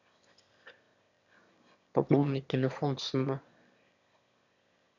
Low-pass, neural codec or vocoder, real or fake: 7.2 kHz; autoencoder, 22.05 kHz, a latent of 192 numbers a frame, VITS, trained on one speaker; fake